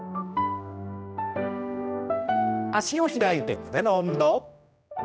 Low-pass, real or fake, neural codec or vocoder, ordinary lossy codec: none; fake; codec, 16 kHz, 1 kbps, X-Codec, HuBERT features, trained on general audio; none